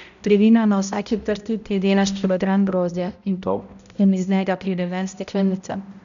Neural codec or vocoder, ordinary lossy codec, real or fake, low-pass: codec, 16 kHz, 0.5 kbps, X-Codec, HuBERT features, trained on balanced general audio; none; fake; 7.2 kHz